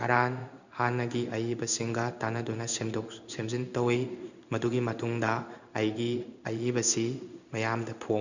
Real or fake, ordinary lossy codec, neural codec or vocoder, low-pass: fake; none; codec, 16 kHz in and 24 kHz out, 1 kbps, XY-Tokenizer; 7.2 kHz